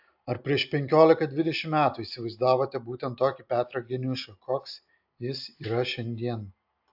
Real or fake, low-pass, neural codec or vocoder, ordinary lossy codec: real; 5.4 kHz; none; AAC, 48 kbps